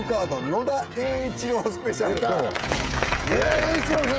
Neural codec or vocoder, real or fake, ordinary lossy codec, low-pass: codec, 16 kHz, 16 kbps, FreqCodec, smaller model; fake; none; none